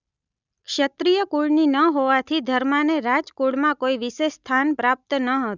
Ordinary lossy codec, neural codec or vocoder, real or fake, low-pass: none; none; real; 7.2 kHz